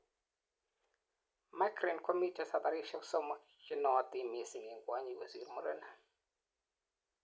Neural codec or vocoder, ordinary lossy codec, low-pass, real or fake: none; none; none; real